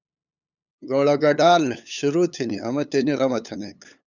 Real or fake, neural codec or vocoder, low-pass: fake; codec, 16 kHz, 8 kbps, FunCodec, trained on LibriTTS, 25 frames a second; 7.2 kHz